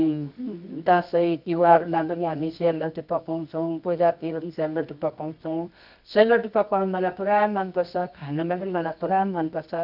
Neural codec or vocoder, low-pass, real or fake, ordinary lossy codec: codec, 24 kHz, 0.9 kbps, WavTokenizer, medium music audio release; 5.4 kHz; fake; none